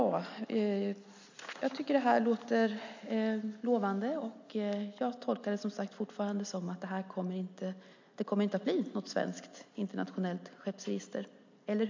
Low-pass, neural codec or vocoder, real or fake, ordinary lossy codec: 7.2 kHz; none; real; MP3, 48 kbps